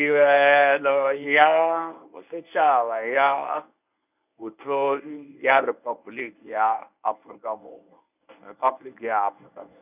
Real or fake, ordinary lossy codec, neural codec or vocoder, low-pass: fake; none; codec, 24 kHz, 0.9 kbps, WavTokenizer, medium speech release version 1; 3.6 kHz